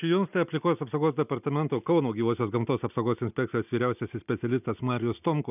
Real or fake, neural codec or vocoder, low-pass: real; none; 3.6 kHz